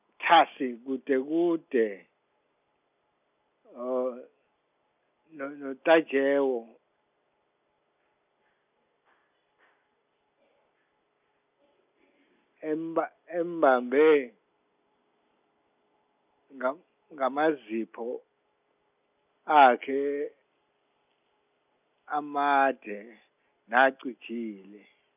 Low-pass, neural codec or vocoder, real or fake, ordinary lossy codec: 3.6 kHz; none; real; none